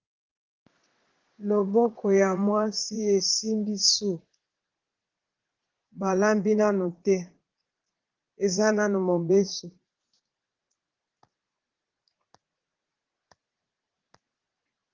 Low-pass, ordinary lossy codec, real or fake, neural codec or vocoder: 7.2 kHz; Opus, 16 kbps; fake; vocoder, 44.1 kHz, 80 mel bands, Vocos